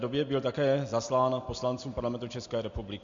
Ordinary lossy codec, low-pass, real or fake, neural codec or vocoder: MP3, 48 kbps; 7.2 kHz; real; none